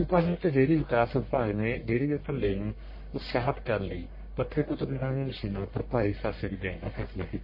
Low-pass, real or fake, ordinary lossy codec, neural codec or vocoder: 5.4 kHz; fake; MP3, 24 kbps; codec, 44.1 kHz, 1.7 kbps, Pupu-Codec